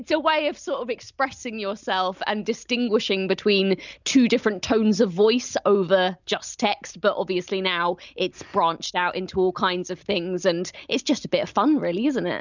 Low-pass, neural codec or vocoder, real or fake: 7.2 kHz; none; real